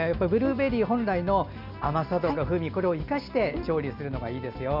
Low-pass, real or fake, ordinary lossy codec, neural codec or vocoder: 5.4 kHz; real; none; none